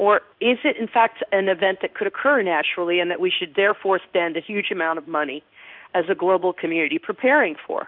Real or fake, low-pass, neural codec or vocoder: fake; 5.4 kHz; codec, 16 kHz in and 24 kHz out, 1 kbps, XY-Tokenizer